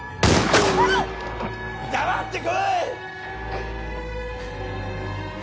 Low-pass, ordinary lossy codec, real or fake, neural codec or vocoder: none; none; real; none